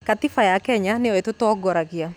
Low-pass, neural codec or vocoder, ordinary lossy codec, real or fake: 19.8 kHz; none; none; real